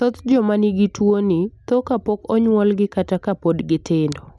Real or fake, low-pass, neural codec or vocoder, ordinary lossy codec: real; none; none; none